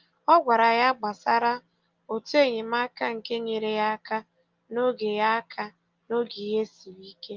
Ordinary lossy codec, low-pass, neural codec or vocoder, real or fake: Opus, 32 kbps; 7.2 kHz; none; real